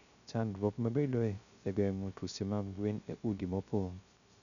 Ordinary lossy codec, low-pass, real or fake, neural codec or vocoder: none; 7.2 kHz; fake; codec, 16 kHz, 0.3 kbps, FocalCodec